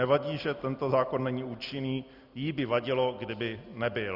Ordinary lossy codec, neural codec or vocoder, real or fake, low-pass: Opus, 64 kbps; none; real; 5.4 kHz